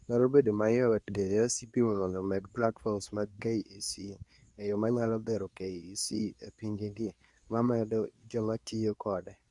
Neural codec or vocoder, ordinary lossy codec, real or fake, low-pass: codec, 24 kHz, 0.9 kbps, WavTokenizer, medium speech release version 2; Opus, 64 kbps; fake; 10.8 kHz